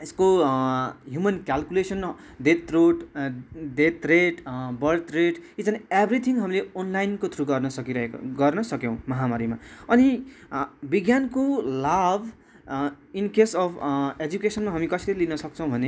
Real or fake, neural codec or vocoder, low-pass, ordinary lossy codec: real; none; none; none